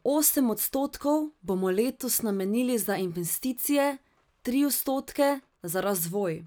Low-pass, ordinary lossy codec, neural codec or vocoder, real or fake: none; none; none; real